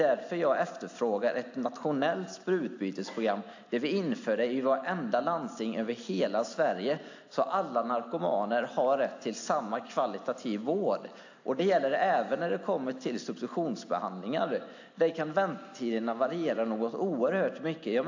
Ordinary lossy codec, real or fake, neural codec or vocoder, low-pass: AAC, 48 kbps; real; none; 7.2 kHz